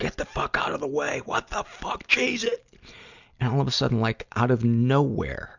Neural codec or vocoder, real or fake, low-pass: none; real; 7.2 kHz